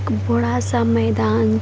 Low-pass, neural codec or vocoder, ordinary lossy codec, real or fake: none; none; none; real